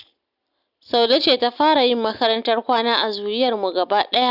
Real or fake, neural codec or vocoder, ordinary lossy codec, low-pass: real; none; none; 5.4 kHz